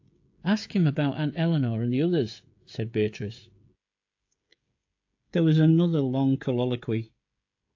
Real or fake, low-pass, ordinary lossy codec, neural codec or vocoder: fake; 7.2 kHz; AAC, 48 kbps; codec, 16 kHz, 16 kbps, FreqCodec, smaller model